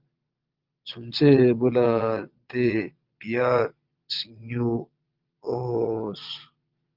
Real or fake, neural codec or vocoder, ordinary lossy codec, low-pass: fake; vocoder, 22.05 kHz, 80 mel bands, Vocos; Opus, 24 kbps; 5.4 kHz